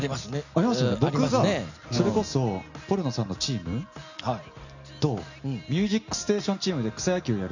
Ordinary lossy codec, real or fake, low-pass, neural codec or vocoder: none; real; 7.2 kHz; none